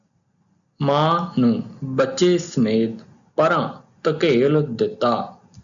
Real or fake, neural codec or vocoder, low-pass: real; none; 7.2 kHz